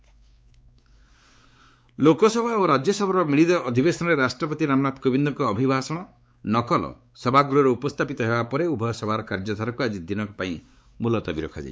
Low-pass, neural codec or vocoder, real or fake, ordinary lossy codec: none; codec, 16 kHz, 4 kbps, X-Codec, WavLM features, trained on Multilingual LibriSpeech; fake; none